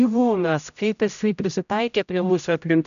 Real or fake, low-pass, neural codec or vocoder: fake; 7.2 kHz; codec, 16 kHz, 0.5 kbps, X-Codec, HuBERT features, trained on general audio